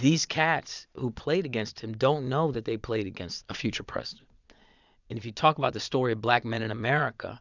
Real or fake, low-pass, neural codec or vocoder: fake; 7.2 kHz; vocoder, 22.05 kHz, 80 mel bands, Vocos